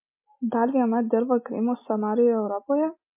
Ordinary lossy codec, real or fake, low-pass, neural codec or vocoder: MP3, 24 kbps; real; 3.6 kHz; none